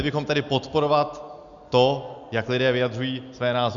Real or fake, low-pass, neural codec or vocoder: real; 7.2 kHz; none